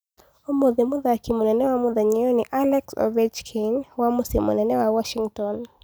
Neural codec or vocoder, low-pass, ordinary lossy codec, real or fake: none; none; none; real